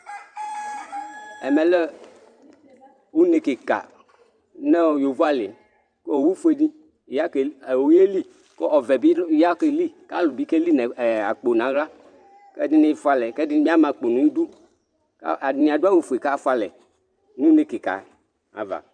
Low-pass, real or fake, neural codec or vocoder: 9.9 kHz; fake; vocoder, 44.1 kHz, 128 mel bands every 512 samples, BigVGAN v2